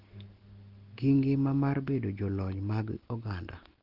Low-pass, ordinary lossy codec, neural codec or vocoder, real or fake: 5.4 kHz; Opus, 16 kbps; none; real